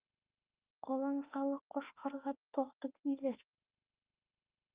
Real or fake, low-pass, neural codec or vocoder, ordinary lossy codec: fake; 3.6 kHz; autoencoder, 48 kHz, 32 numbers a frame, DAC-VAE, trained on Japanese speech; Opus, 32 kbps